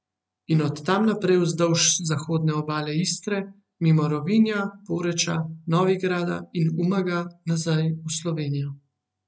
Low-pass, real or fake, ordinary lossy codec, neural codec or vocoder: none; real; none; none